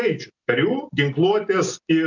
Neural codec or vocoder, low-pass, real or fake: none; 7.2 kHz; real